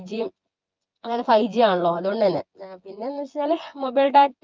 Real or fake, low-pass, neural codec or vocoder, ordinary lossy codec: fake; 7.2 kHz; vocoder, 24 kHz, 100 mel bands, Vocos; Opus, 32 kbps